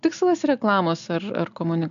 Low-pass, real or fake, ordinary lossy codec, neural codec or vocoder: 7.2 kHz; real; AAC, 96 kbps; none